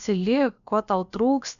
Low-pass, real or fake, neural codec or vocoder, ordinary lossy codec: 7.2 kHz; fake; codec, 16 kHz, about 1 kbps, DyCAST, with the encoder's durations; AAC, 96 kbps